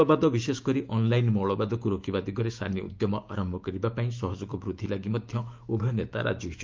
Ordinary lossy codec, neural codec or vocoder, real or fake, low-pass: Opus, 32 kbps; autoencoder, 48 kHz, 128 numbers a frame, DAC-VAE, trained on Japanese speech; fake; 7.2 kHz